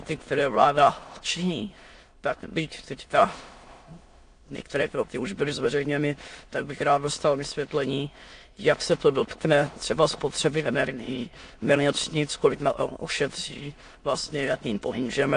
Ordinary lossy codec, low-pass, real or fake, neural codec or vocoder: AAC, 48 kbps; 9.9 kHz; fake; autoencoder, 22.05 kHz, a latent of 192 numbers a frame, VITS, trained on many speakers